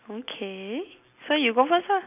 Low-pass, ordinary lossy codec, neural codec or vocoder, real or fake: 3.6 kHz; none; none; real